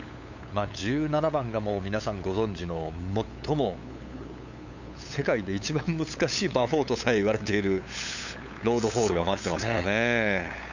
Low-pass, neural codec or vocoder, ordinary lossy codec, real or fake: 7.2 kHz; codec, 16 kHz, 8 kbps, FunCodec, trained on LibriTTS, 25 frames a second; none; fake